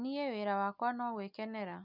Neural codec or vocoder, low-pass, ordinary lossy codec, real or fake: none; 5.4 kHz; none; real